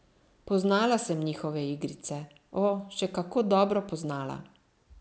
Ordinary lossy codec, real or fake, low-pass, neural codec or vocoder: none; real; none; none